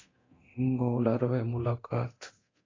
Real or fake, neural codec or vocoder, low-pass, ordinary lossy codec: fake; codec, 24 kHz, 0.9 kbps, DualCodec; 7.2 kHz; AAC, 32 kbps